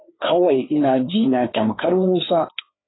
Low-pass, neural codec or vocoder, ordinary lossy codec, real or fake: 7.2 kHz; codec, 16 kHz, 2 kbps, FreqCodec, larger model; AAC, 16 kbps; fake